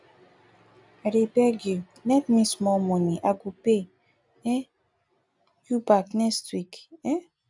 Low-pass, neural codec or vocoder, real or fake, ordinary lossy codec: 10.8 kHz; none; real; none